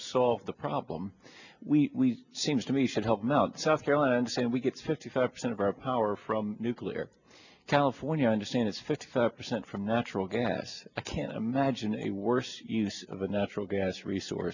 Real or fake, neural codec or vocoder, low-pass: real; none; 7.2 kHz